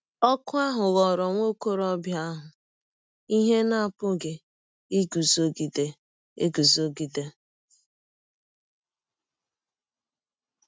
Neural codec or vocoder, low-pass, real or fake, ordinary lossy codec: none; none; real; none